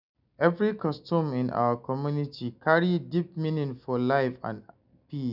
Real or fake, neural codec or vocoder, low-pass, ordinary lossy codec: real; none; 5.4 kHz; none